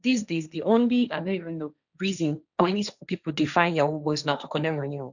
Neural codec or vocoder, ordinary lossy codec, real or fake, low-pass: codec, 16 kHz, 1.1 kbps, Voila-Tokenizer; none; fake; 7.2 kHz